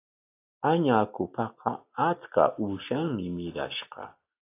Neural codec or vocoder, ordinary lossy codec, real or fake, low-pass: none; AAC, 24 kbps; real; 3.6 kHz